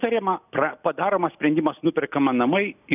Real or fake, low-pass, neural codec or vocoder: real; 3.6 kHz; none